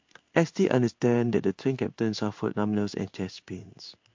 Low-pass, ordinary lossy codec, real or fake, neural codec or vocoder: 7.2 kHz; MP3, 48 kbps; fake; codec, 16 kHz in and 24 kHz out, 1 kbps, XY-Tokenizer